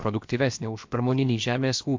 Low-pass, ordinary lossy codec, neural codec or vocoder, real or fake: 7.2 kHz; MP3, 48 kbps; codec, 16 kHz, about 1 kbps, DyCAST, with the encoder's durations; fake